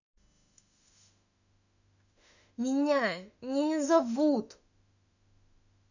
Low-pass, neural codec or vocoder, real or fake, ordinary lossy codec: 7.2 kHz; autoencoder, 48 kHz, 32 numbers a frame, DAC-VAE, trained on Japanese speech; fake; none